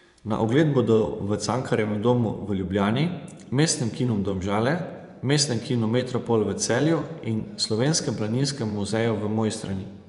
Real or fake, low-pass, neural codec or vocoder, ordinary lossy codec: fake; 10.8 kHz; vocoder, 24 kHz, 100 mel bands, Vocos; none